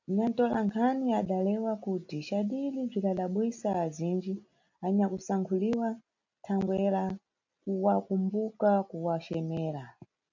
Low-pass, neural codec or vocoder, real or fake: 7.2 kHz; none; real